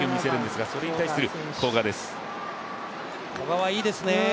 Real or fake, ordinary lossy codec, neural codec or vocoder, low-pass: real; none; none; none